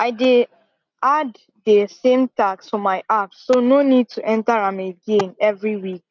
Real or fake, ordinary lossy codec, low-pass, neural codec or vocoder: real; none; 7.2 kHz; none